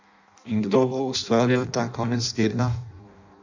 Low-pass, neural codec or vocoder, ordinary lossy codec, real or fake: 7.2 kHz; codec, 16 kHz in and 24 kHz out, 0.6 kbps, FireRedTTS-2 codec; none; fake